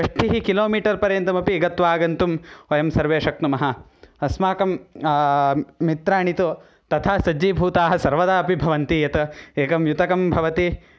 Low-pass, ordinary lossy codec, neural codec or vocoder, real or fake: none; none; none; real